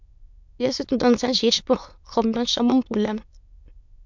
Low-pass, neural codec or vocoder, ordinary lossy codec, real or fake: 7.2 kHz; autoencoder, 22.05 kHz, a latent of 192 numbers a frame, VITS, trained on many speakers; MP3, 64 kbps; fake